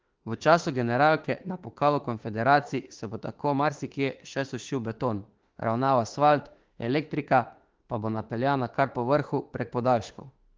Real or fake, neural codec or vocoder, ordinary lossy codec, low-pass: fake; autoencoder, 48 kHz, 32 numbers a frame, DAC-VAE, trained on Japanese speech; Opus, 16 kbps; 7.2 kHz